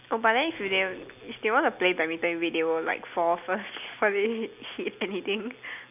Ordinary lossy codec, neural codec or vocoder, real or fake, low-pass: none; none; real; 3.6 kHz